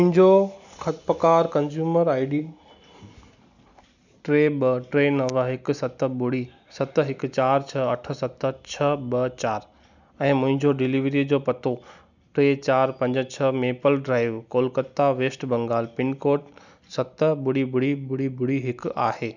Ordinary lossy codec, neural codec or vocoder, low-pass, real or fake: none; none; 7.2 kHz; real